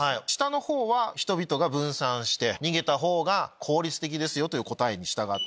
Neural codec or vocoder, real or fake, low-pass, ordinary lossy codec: none; real; none; none